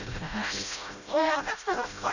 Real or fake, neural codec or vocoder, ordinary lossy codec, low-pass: fake; codec, 16 kHz, 0.5 kbps, FreqCodec, smaller model; none; 7.2 kHz